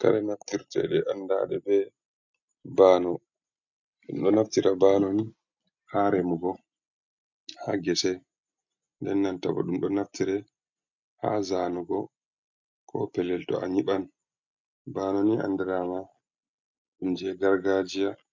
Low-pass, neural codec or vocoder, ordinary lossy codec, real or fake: 7.2 kHz; none; MP3, 64 kbps; real